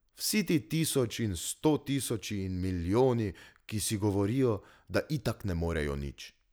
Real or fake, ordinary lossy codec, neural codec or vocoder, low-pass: real; none; none; none